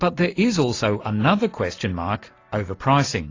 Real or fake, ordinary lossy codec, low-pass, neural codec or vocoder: real; AAC, 32 kbps; 7.2 kHz; none